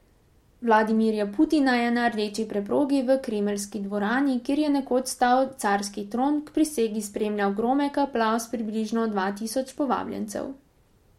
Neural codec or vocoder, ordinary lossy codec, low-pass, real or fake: none; MP3, 64 kbps; 19.8 kHz; real